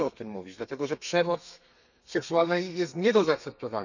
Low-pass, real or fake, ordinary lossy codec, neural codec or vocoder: 7.2 kHz; fake; none; codec, 32 kHz, 1.9 kbps, SNAC